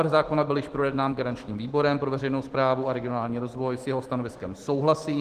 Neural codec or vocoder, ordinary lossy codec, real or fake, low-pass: autoencoder, 48 kHz, 128 numbers a frame, DAC-VAE, trained on Japanese speech; Opus, 16 kbps; fake; 14.4 kHz